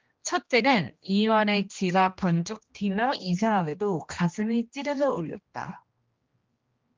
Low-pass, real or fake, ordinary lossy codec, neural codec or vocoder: 7.2 kHz; fake; Opus, 24 kbps; codec, 16 kHz, 1 kbps, X-Codec, HuBERT features, trained on general audio